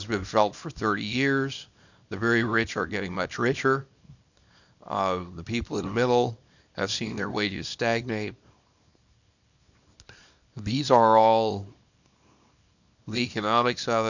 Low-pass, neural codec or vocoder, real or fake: 7.2 kHz; codec, 24 kHz, 0.9 kbps, WavTokenizer, small release; fake